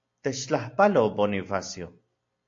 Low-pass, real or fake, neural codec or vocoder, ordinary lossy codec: 7.2 kHz; real; none; AAC, 48 kbps